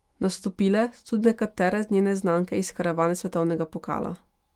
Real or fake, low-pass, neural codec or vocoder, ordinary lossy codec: fake; 19.8 kHz; autoencoder, 48 kHz, 128 numbers a frame, DAC-VAE, trained on Japanese speech; Opus, 24 kbps